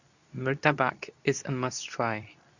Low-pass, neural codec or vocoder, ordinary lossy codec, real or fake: 7.2 kHz; codec, 24 kHz, 0.9 kbps, WavTokenizer, medium speech release version 2; none; fake